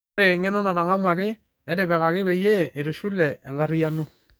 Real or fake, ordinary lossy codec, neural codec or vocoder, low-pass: fake; none; codec, 44.1 kHz, 2.6 kbps, SNAC; none